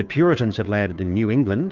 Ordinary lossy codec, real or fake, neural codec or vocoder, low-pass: Opus, 32 kbps; fake; codec, 16 kHz, 2 kbps, FunCodec, trained on Chinese and English, 25 frames a second; 7.2 kHz